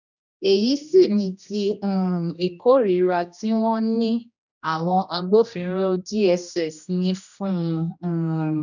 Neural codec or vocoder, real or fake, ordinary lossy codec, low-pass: codec, 16 kHz, 1 kbps, X-Codec, HuBERT features, trained on general audio; fake; none; 7.2 kHz